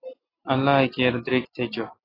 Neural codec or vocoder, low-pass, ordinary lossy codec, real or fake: none; 5.4 kHz; AAC, 24 kbps; real